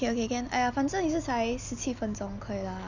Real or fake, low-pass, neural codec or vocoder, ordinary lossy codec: real; 7.2 kHz; none; none